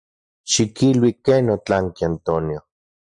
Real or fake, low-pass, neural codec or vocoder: real; 9.9 kHz; none